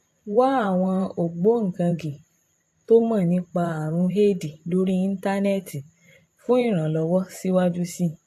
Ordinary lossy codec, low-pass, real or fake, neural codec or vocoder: AAC, 64 kbps; 14.4 kHz; fake; vocoder, 44.1 kHz, 128 mel bands every 512 samples, BigVGAN v2